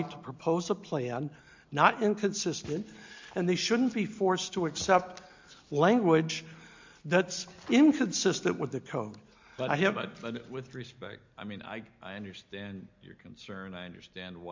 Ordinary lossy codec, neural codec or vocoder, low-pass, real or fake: MP3, 64 kbps; none; 7.2 kHz; real